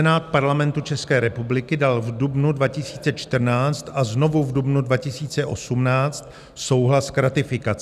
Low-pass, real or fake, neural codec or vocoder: 14.4 kHz; real; none